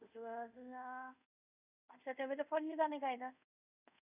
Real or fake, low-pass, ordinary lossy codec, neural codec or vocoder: fake; 3.6 kHz; none; codec, 24 kHz, 0.5 kbps, DualCodec